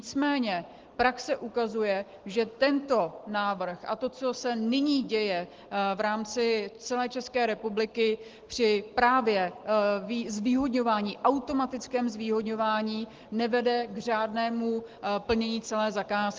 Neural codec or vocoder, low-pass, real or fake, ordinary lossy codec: none; 7.2 kHz; real; Opus, 16 kbps